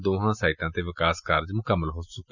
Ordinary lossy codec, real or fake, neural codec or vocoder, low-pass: none; real; none; 7.2 kHz